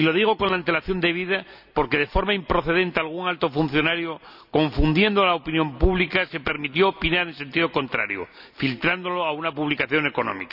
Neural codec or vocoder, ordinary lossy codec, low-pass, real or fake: none; none; 5.4 kHz; real